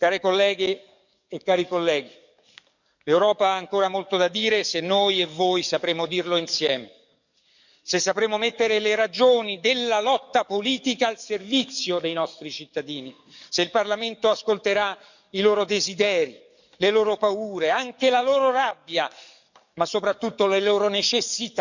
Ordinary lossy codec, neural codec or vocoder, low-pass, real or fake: none; codec, 44.1 kHz, 7.8 kbps, DAC; 7.2 kHz; fake